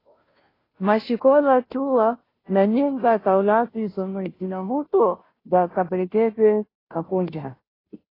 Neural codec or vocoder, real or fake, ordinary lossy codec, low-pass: codec, 16 kHz, 0.5 kbps, FunCodec, trained on Chinese and English, 25 frames a second; fake; AAC, 24 kbps; 5.4 kHz